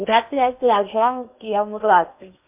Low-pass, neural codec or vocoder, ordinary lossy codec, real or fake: 3.6 kHz; codec, 16 kHz in and 24 kHz out, 0.8 kbps, FocalCodec, streaming, 65536 codes; MP3, 32 kbps; fake